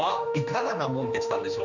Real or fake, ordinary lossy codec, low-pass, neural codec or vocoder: fake; none; 7.2 kHz; codec, 16 kHz, 2 kbps, X-Codec, HuBERT features, trained on general audio